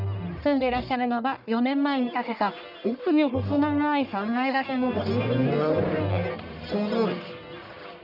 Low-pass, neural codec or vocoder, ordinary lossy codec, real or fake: 5.4 kHz; codec, 44.1 kHz, 1.7 kbps, Pupu-Codec; none; fake